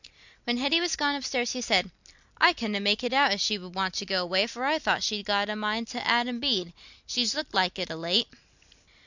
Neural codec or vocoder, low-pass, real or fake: none; 7.2 kHz; real